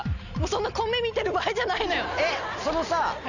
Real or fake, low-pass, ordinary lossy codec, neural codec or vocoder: real; 7.2 kHz; none; none